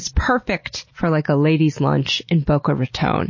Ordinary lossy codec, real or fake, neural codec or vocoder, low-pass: MP3, 32 kbps; real; none; 7.2 kHz